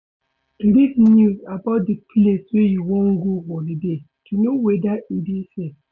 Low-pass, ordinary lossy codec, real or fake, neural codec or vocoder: 7.2 kHz; none; real; none